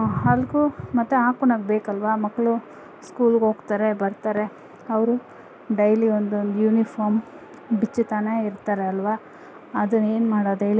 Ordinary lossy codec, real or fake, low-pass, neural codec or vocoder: none; real; none; none